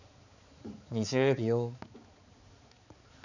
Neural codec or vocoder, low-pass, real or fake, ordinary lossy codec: codec, 16 kHz, 4 kbps, X-Codec, HuBERT features, trained on balanced general audio; 7.2 kHz; fake; none